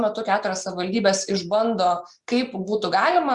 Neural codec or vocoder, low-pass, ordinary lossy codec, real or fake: none; 10.8 kHz; Opus, 64 kbps; real